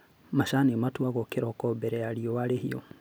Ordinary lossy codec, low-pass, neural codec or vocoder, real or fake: none; none; none; real